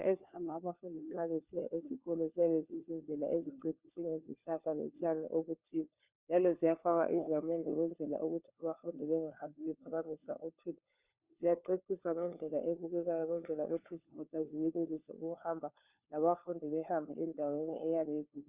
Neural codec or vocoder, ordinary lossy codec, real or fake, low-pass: codec, 16 kHz, 4 kbps, FunCodec, trained on LibriTTS, 50 frames a second; Opus, 64 kbps; fake; 3.6 kHz